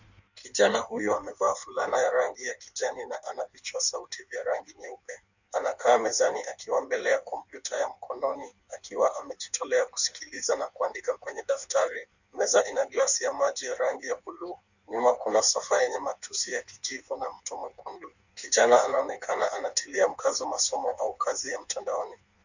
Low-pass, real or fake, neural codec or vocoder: 7.2 kHz; fake; codec, 16 kHz in and 24 kHz out, 1.1 kbps, FireRedTTS-2 codec